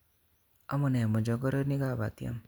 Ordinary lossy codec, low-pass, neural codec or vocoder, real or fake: none; none; none; real